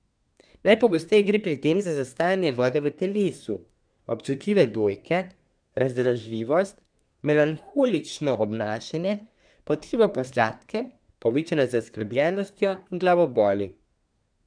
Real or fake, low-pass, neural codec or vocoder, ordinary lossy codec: fake; 9.9 kHz; codec, 24 kHz, 1 kbps, SNAC; MP3, 96 kbps